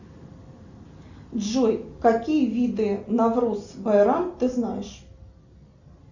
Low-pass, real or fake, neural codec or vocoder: 7.2 kHz; real; none